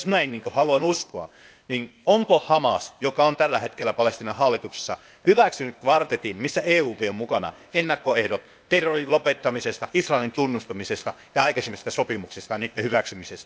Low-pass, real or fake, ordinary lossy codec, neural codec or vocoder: none; fake; none; codec, 16 kHz, 0.8 kbps, ZipCodec